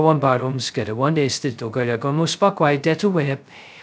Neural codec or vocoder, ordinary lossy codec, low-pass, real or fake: codec, 16 kHz, 0.2 kbps, FocalCodec; none; none; fake